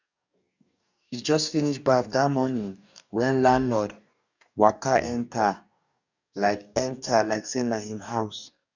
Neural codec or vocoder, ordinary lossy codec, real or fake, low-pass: codec, 44.1 kHz, 2.6 kbps, DAC; none; fake; 7.2 kHz